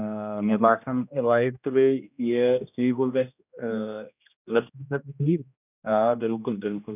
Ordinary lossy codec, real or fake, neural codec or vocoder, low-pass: none; fake; codec, 16 kHz, 1 kbps, X-Codec, HuBERT features, trained on general audio; 3.6 kHz